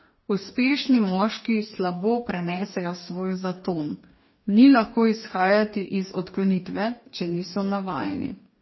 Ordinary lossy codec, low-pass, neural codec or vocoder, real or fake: MP3, 24 kbps; 7.2 kHz; codec, 44.1 kHz, 2.6 kbps, DAC; fake